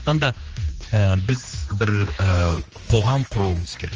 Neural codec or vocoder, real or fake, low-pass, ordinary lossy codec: codec, 16 kHz, 2 kbps, X-Codec, HuBERT features, trained on general audio; fake; 7.2 kHz; Opus, 24 kbps